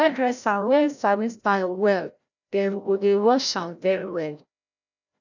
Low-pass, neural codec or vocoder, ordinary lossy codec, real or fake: 7.2 kHz; codec, 16 kHz, 0.5 kbps, FreqCodec, larger model; none; fake